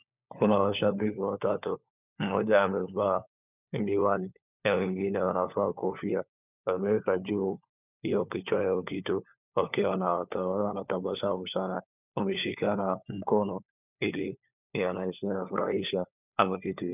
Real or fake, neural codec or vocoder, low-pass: fake; codec, 16 kHz, 4 kbps, FunCodec, trained on LibriTTS, 50 frames a second; 3.6 kHz